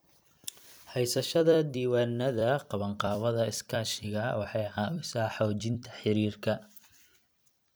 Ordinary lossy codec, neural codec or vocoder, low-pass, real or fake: none; vocoder, 44.1 kHz, 128 mel bands every 256 samples, BigVGAN v2; none; fake